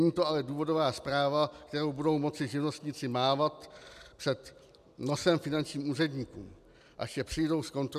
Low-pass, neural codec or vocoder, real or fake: 14.4 kHz; none; real